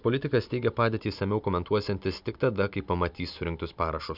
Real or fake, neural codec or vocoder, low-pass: real; none; 5.4 kHz